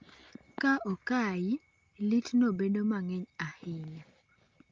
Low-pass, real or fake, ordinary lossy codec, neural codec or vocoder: 7.2 kHz; real; Opus, 24 kbps; none